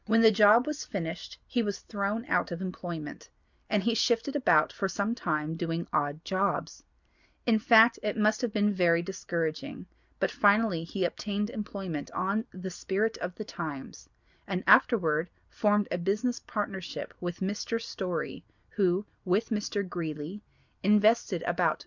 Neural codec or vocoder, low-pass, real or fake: none; 7.2 kHz; real